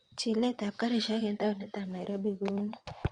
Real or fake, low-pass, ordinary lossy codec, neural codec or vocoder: fake; 9.9 kHz; Opus, 64 kbps; vocoder, 22.05 kHz, 80 mel bands, WaveNeXt